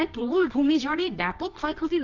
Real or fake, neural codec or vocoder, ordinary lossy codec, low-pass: fake; codec, 24 kHz, 0.9 kbps, WavTokenizer, medium music audio release; none; 7.2 kHz